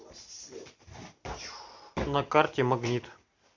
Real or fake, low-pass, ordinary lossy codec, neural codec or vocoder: real; 7.2 kHz; MP3, 64 kbps; none